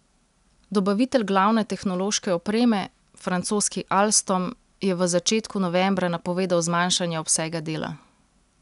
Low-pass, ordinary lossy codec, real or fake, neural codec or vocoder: 10.8 kHz; none; real; none